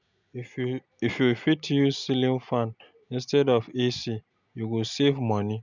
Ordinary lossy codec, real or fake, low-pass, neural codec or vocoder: none; real; 7.2 kHz; none